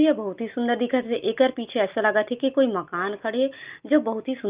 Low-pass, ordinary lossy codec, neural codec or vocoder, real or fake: 3.6 kHz; Opus, 32 kbps; none; real